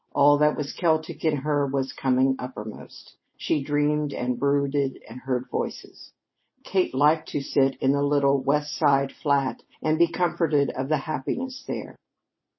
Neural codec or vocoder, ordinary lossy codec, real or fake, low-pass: none; MP3, 24 kbps; real; 7.2 kHz